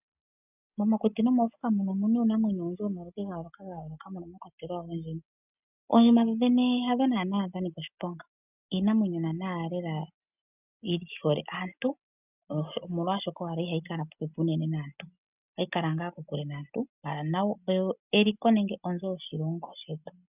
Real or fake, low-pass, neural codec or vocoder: real; 3.6 kHz; none